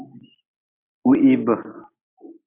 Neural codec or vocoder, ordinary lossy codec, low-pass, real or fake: none; MP3, 24 kbps; 3.6 kHz; real